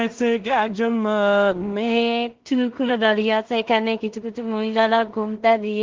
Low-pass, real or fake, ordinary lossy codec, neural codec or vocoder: 7.2 kHz; fake; Opus, 16 kbps; codec, 16 kHz in and 24 kHz out, 0.4 kbps, LongCat-Audio-Codec, two codebook decoder